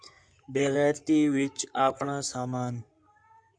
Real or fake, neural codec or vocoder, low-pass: fake; codec, 16 kHz in and 24 kHz out, 2.2 kbps, FireRedTTS-2 codec; 9.9 kHz